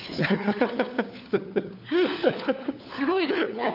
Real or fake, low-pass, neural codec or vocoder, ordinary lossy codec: fake; 5.4 kHz; codec, 16 kHz, 4 kbps, FunCodec, trained on LibriTTS, 50 frames a second; none